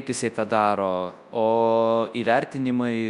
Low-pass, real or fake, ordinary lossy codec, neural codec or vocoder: 10.8 kHz; fake; Opus, 64 kbps; codec, 24 kHz, 0.9 kbps, WavTokenizer, large speech release